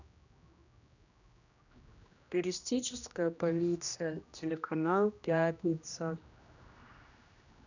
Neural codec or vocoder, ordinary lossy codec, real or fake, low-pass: codec, 16 kHz, 1 kbps, X-Codec, HuBERT features, trained on general audio; none; fake; 7.2 kHz